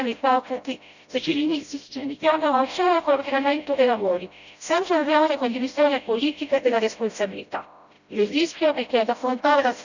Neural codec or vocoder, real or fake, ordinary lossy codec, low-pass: codec, 16 kHz, 0.5 kbps, FreqCodec, smaller model; fake; none; 7.2 kHz